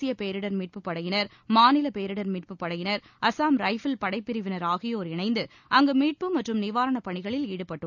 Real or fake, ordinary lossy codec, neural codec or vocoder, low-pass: real; none; none; 7.2 kHz